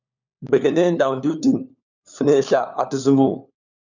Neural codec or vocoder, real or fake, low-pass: codec, 16 kHz, 4 kbps, FunCodec, trained on LibriTTS, 50 frames a second; fake; 7.2 kHz